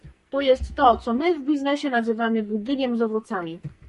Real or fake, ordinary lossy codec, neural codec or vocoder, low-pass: fake; MP3, 48 kbps; codec, 44.1 kHz, 2.6 kbps, SNAC; 14.4 kHz